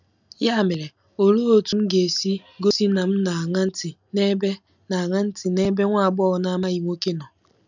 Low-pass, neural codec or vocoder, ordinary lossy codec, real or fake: 7.2 kHz; none; none; real